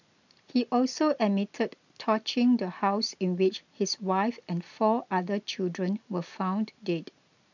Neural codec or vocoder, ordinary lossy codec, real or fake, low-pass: none; none; real; 7.2 kHz